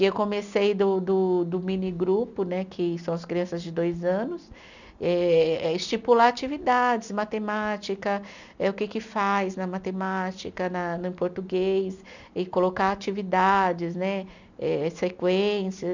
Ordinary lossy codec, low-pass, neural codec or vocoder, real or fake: none; 7.2 kHz; none; real